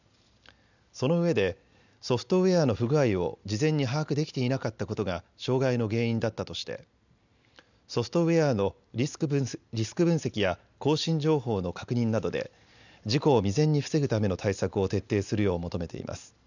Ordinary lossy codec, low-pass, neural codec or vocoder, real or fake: none; 7.2 kHz; none; real